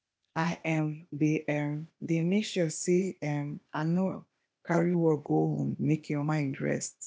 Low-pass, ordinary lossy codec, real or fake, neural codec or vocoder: none; none; fake; codec, 16 kHz, 0.8 kbps, ZipCodec